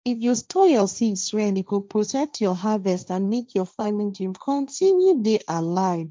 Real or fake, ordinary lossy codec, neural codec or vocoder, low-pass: fake; none; codec, 16 kHz, 1.1 kbps, Voila-Tokenizer; none